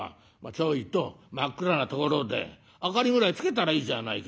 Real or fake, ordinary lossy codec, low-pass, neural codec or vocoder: real; none; none; none